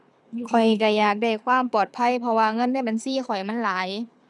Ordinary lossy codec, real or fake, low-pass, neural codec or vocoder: none; fake; none; codec, 24 kHz, 6 kbps, HILCodec